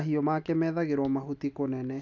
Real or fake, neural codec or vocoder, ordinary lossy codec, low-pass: real; none; none; 7.2 kHz